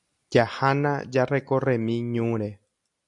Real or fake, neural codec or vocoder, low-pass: real; none; 10.8 kHz